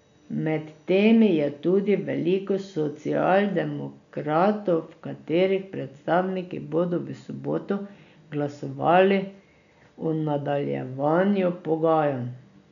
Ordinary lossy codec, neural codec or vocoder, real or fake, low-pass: none; none; real; 7.2 kHz